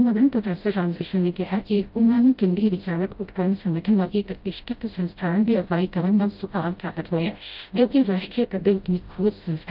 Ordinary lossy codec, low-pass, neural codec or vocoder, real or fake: Opus, 24 kbps; 5.4 kHz; codec, 16 kHz, 0.5 kbps, FreqCodec, smaller model; fake